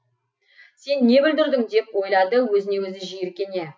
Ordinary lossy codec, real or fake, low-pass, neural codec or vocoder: none; real; none; none